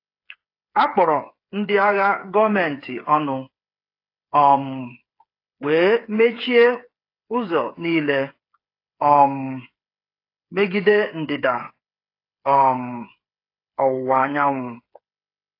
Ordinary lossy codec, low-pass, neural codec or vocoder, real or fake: AAC, 32 kbps; 5.4 kHz; codec, 16 kHz, 8 kbps, FreqCodec, smaller model; fake